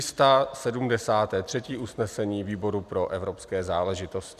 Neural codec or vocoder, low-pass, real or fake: none; 14.4 kHz; real